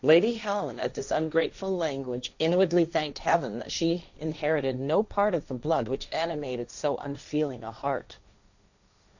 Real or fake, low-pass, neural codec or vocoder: fake; 7.2 kHz; codec, 16 kHz, 1.1 kbps, Voila-Tokenizer